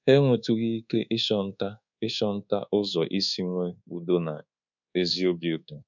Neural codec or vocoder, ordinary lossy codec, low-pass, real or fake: codec, 24 kHz, 1.2 kbps, DualCodec; none; 7.2 kHz; fake